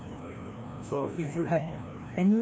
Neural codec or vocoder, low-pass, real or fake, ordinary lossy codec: codec, 16 kHz, 1 kbps, FreqCodec, larger model; none; fake; none